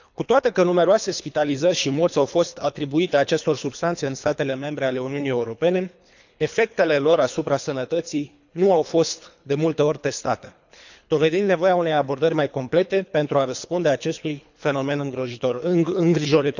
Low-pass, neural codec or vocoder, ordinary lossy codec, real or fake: 7.2 kHz; codec, 24 kHz, 3 kbps, HILCodec; none; fake